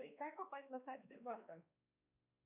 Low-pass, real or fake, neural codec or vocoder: 3.6 kHz; fake; codec, 16 kHz, 2 kbps, X-Codec, WavLM features, trained on Multilingual LibriSpeech